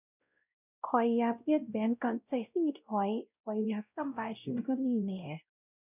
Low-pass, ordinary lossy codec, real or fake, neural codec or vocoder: 3.6 kHz; none; fake; codec, 16 kHz, 0.5 kbps, X-Codec, WavLM features, trained on Multilingual LibriSpeech